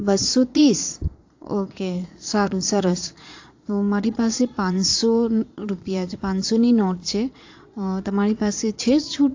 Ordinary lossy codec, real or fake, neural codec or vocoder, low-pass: AAC, 48 kbps; fake; vocoder, 22.05 kHz, 80 mel bands, Vocos; 7.2 kHz